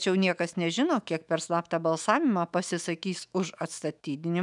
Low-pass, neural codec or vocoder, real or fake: 10.8 kHz; autoencoder, 48 kHz, 128 numbers a frame, DAC-VAE, trained on Japanese speech; fake